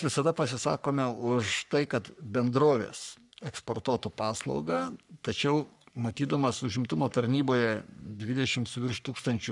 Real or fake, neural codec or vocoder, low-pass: fake; codec, 44.1 kHz, 3.4 kbps, Pupu-Codec; 10.8 kHz